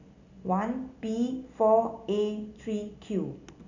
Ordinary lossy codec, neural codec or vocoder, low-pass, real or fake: none; none; 7.2 kHz; real